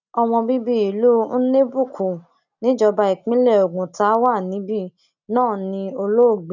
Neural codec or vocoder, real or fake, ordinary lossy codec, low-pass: none; real; none; 7.2 kHz